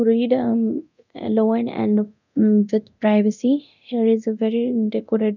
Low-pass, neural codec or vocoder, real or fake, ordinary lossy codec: 7.2 kHz; codec, 24 kHz, 0.9 kbps, DualCodec; fake; none